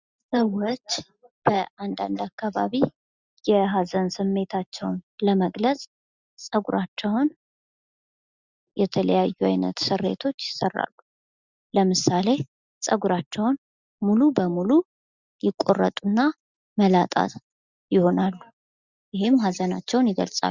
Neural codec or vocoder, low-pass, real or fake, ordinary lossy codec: none; 7.2 kHz; real; Opus, 64 kbps